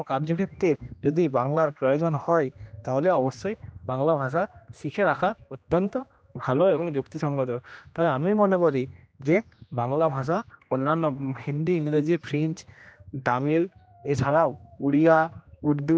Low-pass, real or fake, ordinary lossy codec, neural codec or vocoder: none; fake; none; codec, 16 kHz, 1 kbps, X-Codec, HuBERT features, trained on general audio